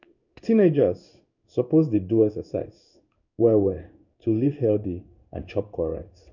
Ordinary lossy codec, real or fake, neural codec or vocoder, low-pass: none; fake; codec, 16 kHz in and 24 kHz out, 1 kbps, XY-Tokenizer; 7.2 kHz